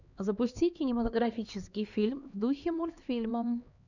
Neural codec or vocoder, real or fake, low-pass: codec, 16 kHz, 2 kbps, X-Codec, HuBERT features, trained on LibriSpeech; fake; 7.2 kHz